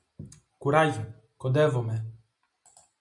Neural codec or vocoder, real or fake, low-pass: none; real; 10.8 kHz